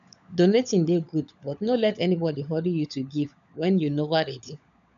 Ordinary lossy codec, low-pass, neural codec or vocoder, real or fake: AAC, 96 kbps; 7.2 kHz; codec, 16 kHz, 16 kbps, FunCodec, trained on LibriTTS, 50 frames a second; fake